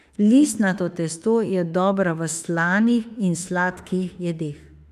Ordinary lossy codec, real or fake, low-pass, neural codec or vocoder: none; fake; 14.4 kHz; autoencoder, 48 kHz, 32 numbers a frame, DAC-VAE, trained on Japanese speech